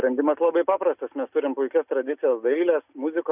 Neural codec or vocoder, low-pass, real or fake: none; 3.6 kHz; real